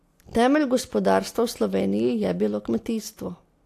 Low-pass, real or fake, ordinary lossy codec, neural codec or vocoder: 14.4 kHz; real; AAC, 64 kbps; none